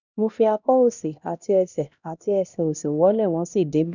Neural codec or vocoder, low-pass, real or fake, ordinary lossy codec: codec, 16 kHz, 1 kbps, X-Codec, HuBERT features, trained on LibriSpeech; 7.2 kHz; fake; none